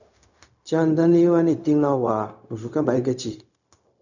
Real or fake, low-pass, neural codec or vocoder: fake; 7.2 kHz; codec, 16 kHz, 0.4 kbps, LongCat-Audio-Codec